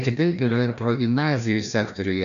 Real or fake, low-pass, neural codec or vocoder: fake; 7.2 kHz; codec, 16 kHz, 1 kbps, FreqCodec, larger model